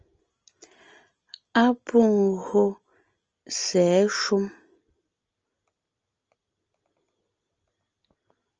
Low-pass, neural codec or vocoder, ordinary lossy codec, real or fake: 7.2 kHz; none; Opus, 24 kbps; real